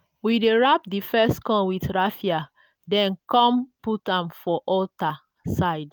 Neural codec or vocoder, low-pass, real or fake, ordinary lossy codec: none; none; real; none